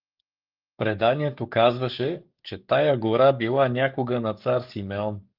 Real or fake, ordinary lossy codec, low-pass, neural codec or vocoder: fake; Opus, 32 kbps; 5.4 kHz; codec, 44.1 kHz, 7.8 kbps, DAC